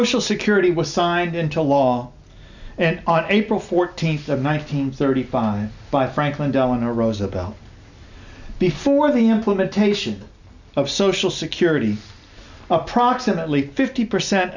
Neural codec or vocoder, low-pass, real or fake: none; 7.2 kHz; real